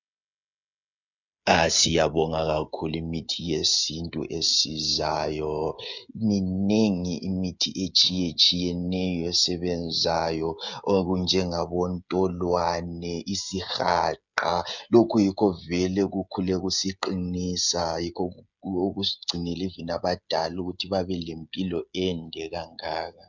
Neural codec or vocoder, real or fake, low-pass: codec, 16 kHz, 16 kbps, FreqCodec, smaller model; fake; 7.2 kHz